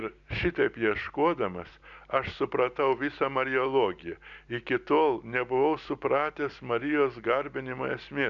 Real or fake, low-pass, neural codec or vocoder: real; 7.2 kHz; none